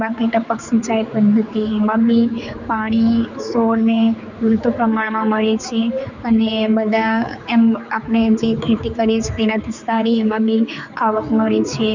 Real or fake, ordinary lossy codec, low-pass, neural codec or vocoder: fake; none; 7.2 kHz; codec, 16 kHz, 4 kbps, X-Codec, HuBERT features, trained on general audio